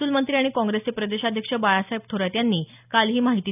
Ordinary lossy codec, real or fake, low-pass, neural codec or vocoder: none; real; 3.6 kHz; none